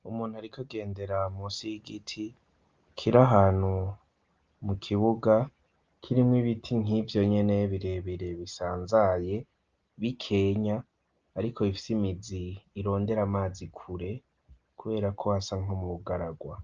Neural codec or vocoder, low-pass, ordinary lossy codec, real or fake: none; 7.2 kHz; Opus, 24 kbps; real